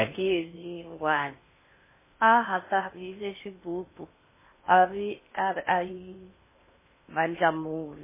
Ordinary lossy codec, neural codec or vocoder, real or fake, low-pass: MP3, 16 kbps; codec, 16 kHz in and 24 kHz out, 0.6 kbps, FocalCodec, streaming, 4096 codes; fake; 3.6 kHz